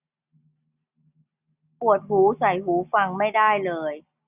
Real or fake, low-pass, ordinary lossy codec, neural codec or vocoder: real; 3.6 kHz; none; none